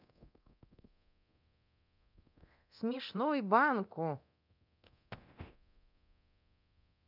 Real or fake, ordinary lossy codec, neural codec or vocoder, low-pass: fake; none; codec, 24 kHz, 0.9 kbps, DualCodec; 5.4 kHz